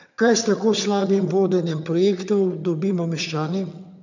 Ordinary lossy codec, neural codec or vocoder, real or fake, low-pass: none; vocoder, 22.05 kHz, 80 mel bands, HiFi-GAN; fake; 7.2 kHz